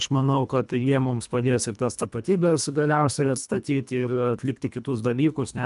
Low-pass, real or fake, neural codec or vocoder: 10.8 kHz; fake; codec, 24 kHz, 1.5 kbps, HILCodec